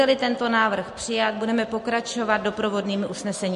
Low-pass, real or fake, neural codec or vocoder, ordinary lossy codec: 14.4 kHz; real; none; MP3, 48 kbps